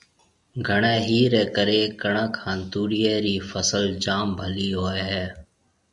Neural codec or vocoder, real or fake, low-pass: none; real; 10.8 kHz